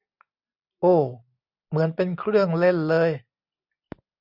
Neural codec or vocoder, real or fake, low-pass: none; real; 5.4 kHz